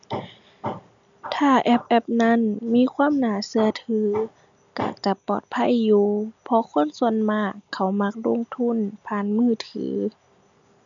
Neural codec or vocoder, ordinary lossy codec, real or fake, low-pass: none; none; real; 7.2 kHz